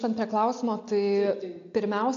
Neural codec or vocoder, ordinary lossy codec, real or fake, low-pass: none; AAC, 48 kbps; real; 7.2 kHz